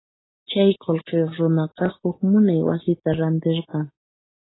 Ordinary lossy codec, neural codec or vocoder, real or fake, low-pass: AAC, 16 kbps; none; real; 7.2 kHz